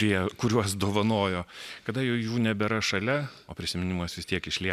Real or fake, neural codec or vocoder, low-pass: real; none; 14.4 kHz